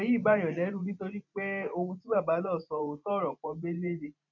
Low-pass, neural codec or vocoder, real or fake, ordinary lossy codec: 7.2 kHz; vocoder, 44.1 kHz, 128 mel bands every 512 samples, BigVGAN v2; fake; none